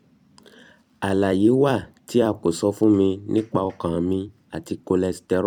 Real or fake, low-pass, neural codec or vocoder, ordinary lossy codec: fake; 19.8 kHz; vocoder, 44.1 kHz, 128 mel bands every 256 samples, BigVGAN v2; none